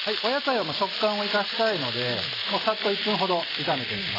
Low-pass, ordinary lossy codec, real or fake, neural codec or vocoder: 5.4 kHz; AAC, 24 kbps; real; none